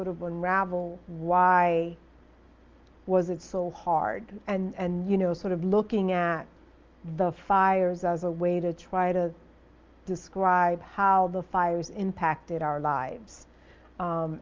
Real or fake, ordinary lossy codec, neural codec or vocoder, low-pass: real; Opus, 24 kbps; none; 7.2 kHz